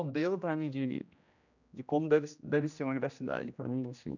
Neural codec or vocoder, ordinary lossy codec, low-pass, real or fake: codec, 16 kHz, 1 kbps, X-Codec, HuBERT features, trained on general audio; none; 7.2 kHz; fake